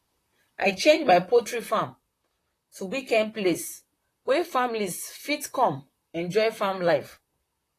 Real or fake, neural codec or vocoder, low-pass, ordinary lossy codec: fake; vocoder, 44.1 kHz, 128 mel bands, Pupu-Vocoder; 14.4 kHz; AAC, 48 kbps